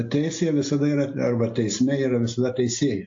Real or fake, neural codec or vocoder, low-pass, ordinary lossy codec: real; none; 7.2 kHz; AAC, 48 kbps